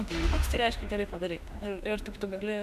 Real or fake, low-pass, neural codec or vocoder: fake; 14.4 kHz; autoencoder, 48 kHz, 32 numbers a frame, DAC-VAE, trained on Japanese speech